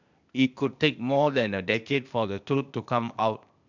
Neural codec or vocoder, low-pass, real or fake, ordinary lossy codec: codec, 16 kHz, 0.8 kbps, ZipCodec; 7.2 kHz; fake; none